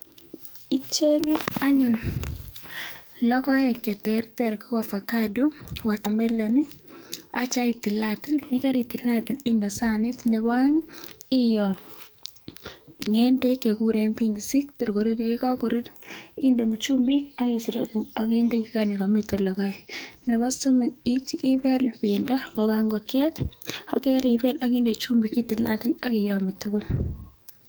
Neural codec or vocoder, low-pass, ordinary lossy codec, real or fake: codec, 44.1 kHz, 2.6 kbps, SNAC; none; none; fake